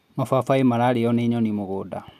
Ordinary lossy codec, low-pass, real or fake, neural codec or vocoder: none; 14.4 kHz; real; none